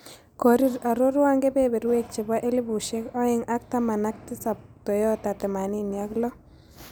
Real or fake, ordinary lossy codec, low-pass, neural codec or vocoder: real; none; none; none